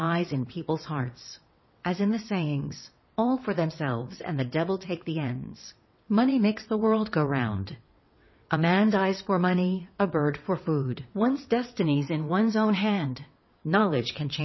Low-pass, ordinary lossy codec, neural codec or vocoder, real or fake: 7.2 kHz; MP3, 24 kbps; vocoder, 22.05 kHz, 80 mel bands, WaveNeXt; fake